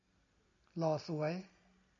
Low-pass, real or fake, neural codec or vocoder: 7.2 kHz; real; none